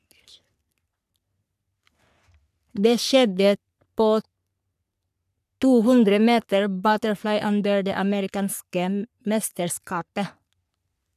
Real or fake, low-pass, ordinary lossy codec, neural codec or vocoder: fake; 14.4 kHz; none; codec, 44.1 kHz, 3.4 kbps, Pupu-Codec